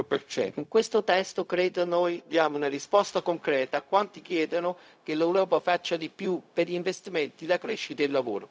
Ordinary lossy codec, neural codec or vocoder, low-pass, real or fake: none; codec, 16 kHz, 0.4 kbps, LongCat-Audio-Codec; none; fake